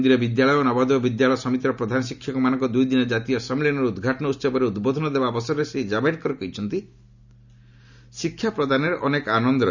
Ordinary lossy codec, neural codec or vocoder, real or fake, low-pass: none; none; real; 7.2 kHz